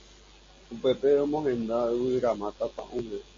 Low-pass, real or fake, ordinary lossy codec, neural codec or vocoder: 7.2 kHz; real; MP3, 32 kbps; none